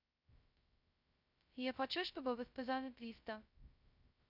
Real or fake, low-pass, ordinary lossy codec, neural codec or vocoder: fake; 5.4 kHz; none; codec, 16 kHz, 0.2 kbps, FocalCodec